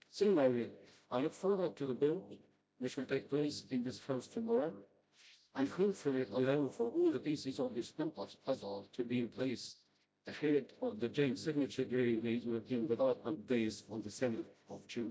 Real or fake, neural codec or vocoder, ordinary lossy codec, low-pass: fake; codec, 16 kHz, 0.5 kbps, FreqCodec, smaller model; none; none